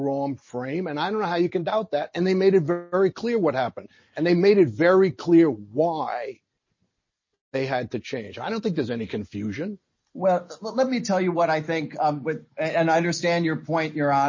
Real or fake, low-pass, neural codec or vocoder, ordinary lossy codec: real; 7.2 kHz; none; MP3, 32 kbps